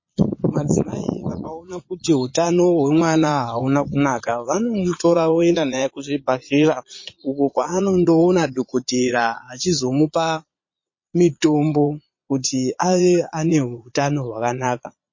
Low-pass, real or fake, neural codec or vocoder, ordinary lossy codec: 7.2 kHz; fake; vocoder, 22.05 kHz, 80 mel bands, Vocos; MP3, 32 kbps